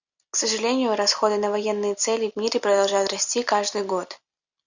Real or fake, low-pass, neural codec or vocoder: real; 7.2 kHz; none